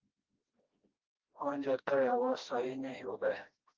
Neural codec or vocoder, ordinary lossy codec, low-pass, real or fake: codec, 16 kHz, 1 kbps, FreqCodec, smaller model; Opus, 32 kbps; 7.2 kHz; fake